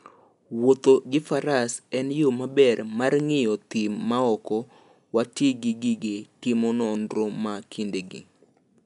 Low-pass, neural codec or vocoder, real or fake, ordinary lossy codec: 10.8 kHz; none; real; none